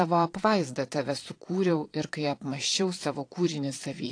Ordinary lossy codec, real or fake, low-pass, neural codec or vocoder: AAC, 48 kbps; fake; 9.9 kHz; vocoder, 22.05 kHz, 80 mel bands, Vocos